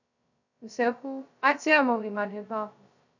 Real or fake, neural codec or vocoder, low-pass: fake; codec, 16 kHz, 0.2 kbps, FocalCodec; 7.2 kHz